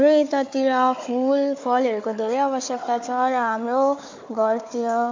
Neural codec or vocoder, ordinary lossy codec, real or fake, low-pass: codec, 16 kHz, 4 kbps, FunCodec, trained on Chinese and English, 50 frames a second; MP3, 48 kbps; fake; 7.2 kHz